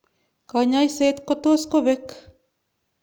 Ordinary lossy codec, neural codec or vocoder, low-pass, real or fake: none; none; none; real